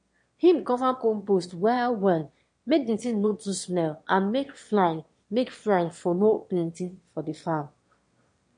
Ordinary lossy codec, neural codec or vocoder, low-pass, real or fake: MP3, 48 kbps; autoencoder, 22.05 kHz, a latent of 192 numbers a frame, VITS, trained on one speaker; 9.9 kHz; fake